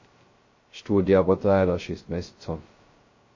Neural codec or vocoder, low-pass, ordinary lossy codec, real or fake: codec, 16 kHz, 0.2 kbps, FocalCodec; 7.2 kHz; MP3, 32 kbps; fake